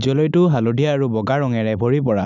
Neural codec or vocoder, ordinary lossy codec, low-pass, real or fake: none; none; 7.2 kHz; real